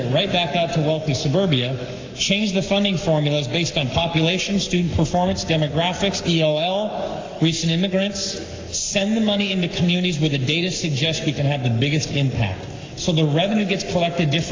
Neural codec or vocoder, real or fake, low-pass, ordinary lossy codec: codec, 44.1 kHz, 7.8 kbps, Pupu-Codec; fake; 7.2 kHz; AAC, 32 kbps